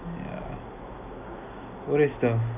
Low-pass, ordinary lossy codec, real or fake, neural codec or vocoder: 3.6 kHz; AAC, 32 kbps; real; none